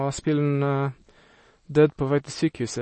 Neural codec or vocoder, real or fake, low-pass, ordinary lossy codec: none; real; 10.8 kHz; MP3, 32 kbps